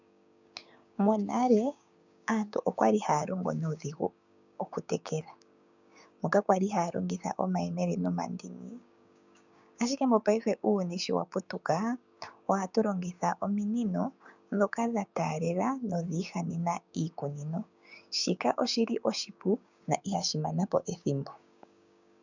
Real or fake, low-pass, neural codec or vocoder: fake; 7.2 kHz; codec, 16 kHz, 6 kbps, DAC